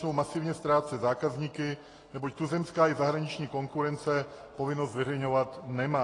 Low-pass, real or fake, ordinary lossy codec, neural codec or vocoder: 10.8 kHz; real; AAC, 32 kbps; none